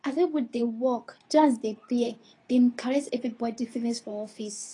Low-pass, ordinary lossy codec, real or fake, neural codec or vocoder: 10.8 kHz; AAC, 32 kbps; fake; codec, 24 kHz, 0.9 kbps, WavTokenizer, medium speech release version 2